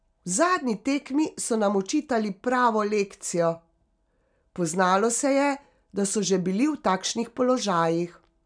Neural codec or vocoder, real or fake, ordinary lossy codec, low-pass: none; real; none; 9.9 kHz